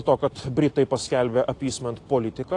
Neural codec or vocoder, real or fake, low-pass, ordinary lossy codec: none; real; 10.8 kHz; AAC, 48 kbps